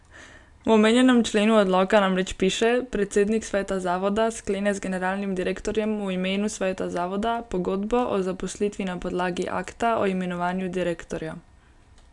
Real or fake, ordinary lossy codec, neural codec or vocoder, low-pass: real; none; none; 10.8 kHz